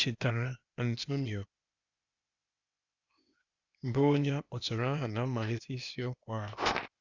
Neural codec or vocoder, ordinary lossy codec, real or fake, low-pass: codec, 16 kHz, 0.8 kbps, ZipCodec; Opus, 64 kbps; fake; 7.2 kHz